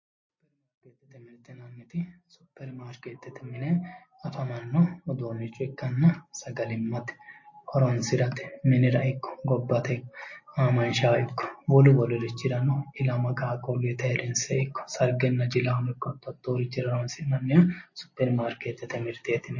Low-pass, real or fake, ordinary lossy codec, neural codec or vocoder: 7.2 kHz; real; MP3, 32 kbps; none